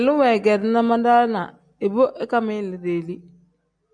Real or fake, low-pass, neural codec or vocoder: real; 9.9 kHz; none